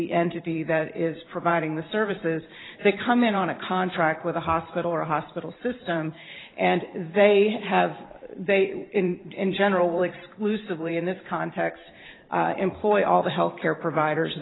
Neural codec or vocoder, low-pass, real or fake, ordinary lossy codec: vocoder, 44.1 kHz, 128 mel bands every 512 samples, BigVGAN v2; 7.2 kHz; fake; AAC, 16 kbps